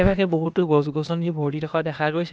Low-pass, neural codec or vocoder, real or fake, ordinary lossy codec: none; codec, 16 kHz, 0.8 kbps, ZipCodec; fake; none